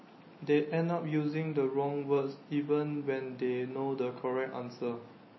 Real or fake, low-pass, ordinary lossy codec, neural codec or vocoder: real; 7.2 kHz; MP3, 24 kbps; none